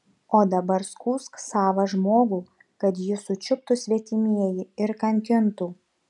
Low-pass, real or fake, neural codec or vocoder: 10.8 kHz; real; none